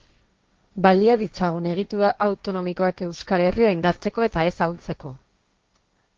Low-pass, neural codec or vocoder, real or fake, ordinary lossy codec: 7.2 kHz; codec, 16 kHz, 1.1 kbps, Voila-Tokenizer; fake; Opus, 32 kbps